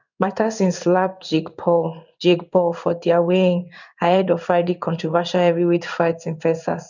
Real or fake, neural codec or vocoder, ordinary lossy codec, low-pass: fake; codec, 16 kHz in and 24 kHz out, 1 kbps, XY-Tokenizer; none; 7.2 kHz